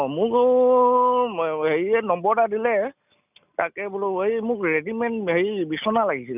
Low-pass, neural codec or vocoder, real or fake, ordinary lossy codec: 3.6 kHz; none; real; none